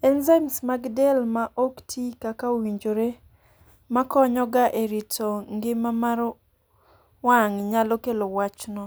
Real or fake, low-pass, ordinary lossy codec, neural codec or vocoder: real; none; none; none